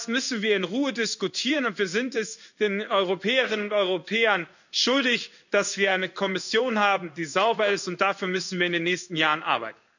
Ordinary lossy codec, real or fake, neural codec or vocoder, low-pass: none; fake; codec, 16 kHz in and 24 kHz out, 1 kbps, XY-Tokenizer; 7.2 kHz